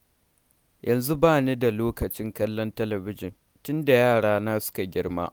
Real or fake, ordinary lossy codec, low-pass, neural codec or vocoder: real; none; none; none